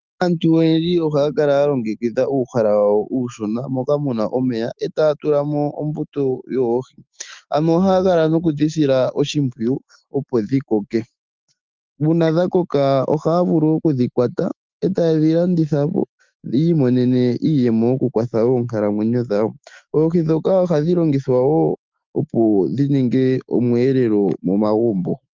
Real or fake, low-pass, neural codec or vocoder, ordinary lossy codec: fake; 7.2 kHz; autoencoder, 48 kHz, 128 numbers a frame, DAC-VAE, trained on Japanese speech; Opus, 24 kbps